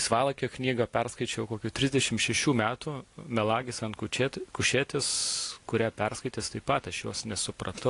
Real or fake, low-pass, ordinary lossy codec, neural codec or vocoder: real; 10.8 kHz; AAC, 48 kbps; none